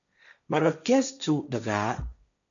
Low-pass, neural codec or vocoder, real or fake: 7.2 kHz; codec, 16 kHz, 1.1 kbps, Voila-Tokenizer; fake